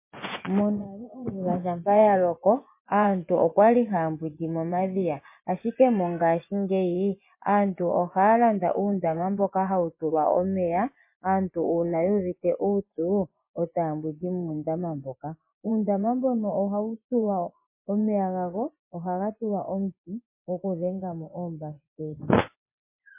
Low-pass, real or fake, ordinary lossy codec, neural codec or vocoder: 3.6 kHz; fake; MP3, 24 kbps; vocoder, 24 kHz, 100 mel bands, Vocos